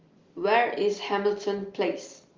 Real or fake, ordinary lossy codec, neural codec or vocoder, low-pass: real; Opus, 32 kbps; none; 7.2 kHz